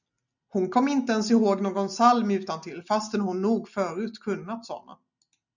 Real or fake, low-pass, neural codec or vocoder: real; 7.2 kHz; none